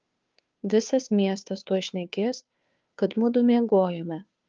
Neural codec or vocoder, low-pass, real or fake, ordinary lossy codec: codec, 16 kHz, 2 kbps, FunCodec, trained on Chinese and English, 25 frames a second; 7.2 kHz; fake; Opus, 24 kbps